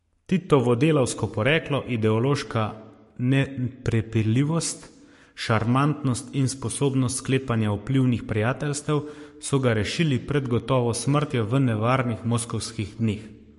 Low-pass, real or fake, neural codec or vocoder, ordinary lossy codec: 14.4 kHz; fake; codec, 44.1 kHz, 7.8 kbps, Pupu-Codec; MP3, 48 kbps